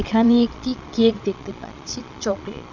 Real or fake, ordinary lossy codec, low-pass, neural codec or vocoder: fake; none; 7.2 kHz; vocoder, 22.05 kHz, 80 mel bands, Vocos